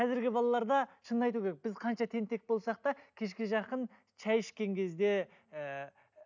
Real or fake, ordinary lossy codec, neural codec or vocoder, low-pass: real; none; none; 7.2 kHz